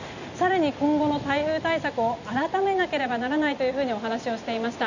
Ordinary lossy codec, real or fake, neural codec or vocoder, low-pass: none; real; none; 7.2 kHz